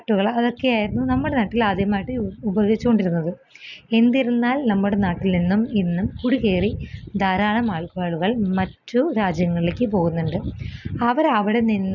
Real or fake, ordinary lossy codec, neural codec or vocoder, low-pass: real; none; none; 7.2 kHz